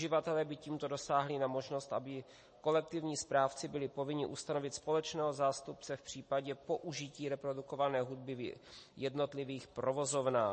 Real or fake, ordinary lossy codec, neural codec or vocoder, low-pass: real; MP3, 32 kbps; none; 9.9 kHz